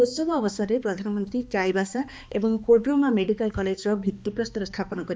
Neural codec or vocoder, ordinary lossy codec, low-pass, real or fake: codec, 16 kHz, 2 kbps, X-Codec, HuBERT features, trained on balanced general audio; none; none; fake